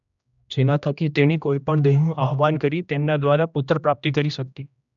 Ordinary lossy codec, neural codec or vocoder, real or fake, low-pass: none; codec, 16 kHz, 1 kbps, X-Codec, HuBERT features, trained on general audio; fake; 7.2 kHz